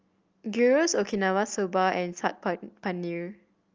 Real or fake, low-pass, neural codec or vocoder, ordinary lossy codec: real; 7.2 kHz; none; Opus, 24 kbps